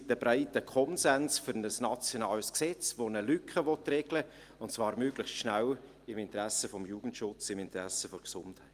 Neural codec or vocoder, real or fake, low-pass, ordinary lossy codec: none; real; 14.4 kHz; Opus, 32 kbps